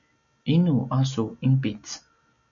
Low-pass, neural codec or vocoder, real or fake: 7.2 kHz; none; real